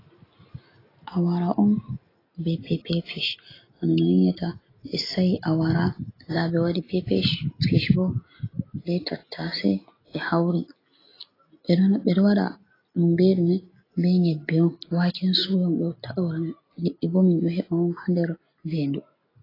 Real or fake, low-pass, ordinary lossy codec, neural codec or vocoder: real; 5.4 kHz; AAC, 24 kbps; none